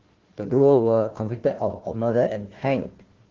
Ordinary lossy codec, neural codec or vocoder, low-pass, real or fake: Opus, 16 kbps; codec, 16 kHz, 1 kbps, FunCodec, trained on Chinese and English, 50 frames a second; 7.2 kHz; fake